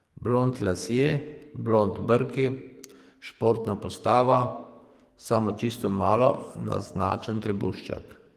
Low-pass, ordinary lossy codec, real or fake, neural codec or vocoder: 14.4 kHz; Opus, 24 kbps; fake; codec, 44.1 kHz, 2.6 kbps, SNAC